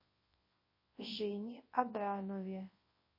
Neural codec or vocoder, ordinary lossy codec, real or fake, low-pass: codec, 24 kHz, 0.9 kbps, WavTokenizer, large speech release; MP3, 24 kbps; fake; 5.4 kHz